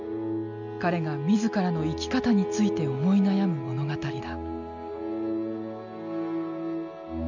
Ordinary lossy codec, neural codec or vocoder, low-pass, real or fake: none; none; 7.2 kHz; real